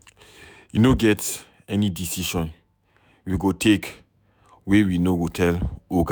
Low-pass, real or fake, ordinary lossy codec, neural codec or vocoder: none; fake; none; autoencoder, 48 kHz, 128 numbers a frame, DAC-VAE, trained on Japanese speech